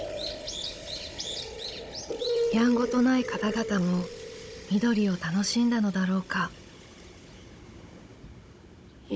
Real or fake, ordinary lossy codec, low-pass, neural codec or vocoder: fake; none; none; codec, 16 kHz, 16 kbps, FunCodec, trained on Chinese and English, 50 frames a second